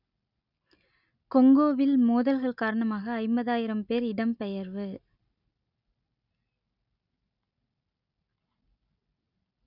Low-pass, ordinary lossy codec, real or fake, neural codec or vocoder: 5.4 kHz; none; real; none